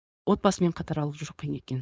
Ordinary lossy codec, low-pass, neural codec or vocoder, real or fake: none; none; codec, 16 kHz, 4.8 kbps, FACodec; fake